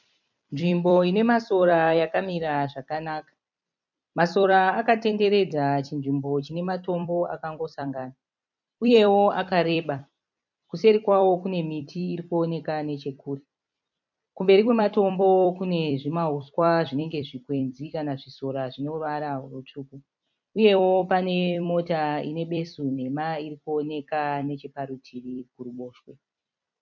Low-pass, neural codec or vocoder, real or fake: 7.2 kHz; vocoder, 44.1 kHz, 128 mel bands every 256 samples, BigVGAN v2; fake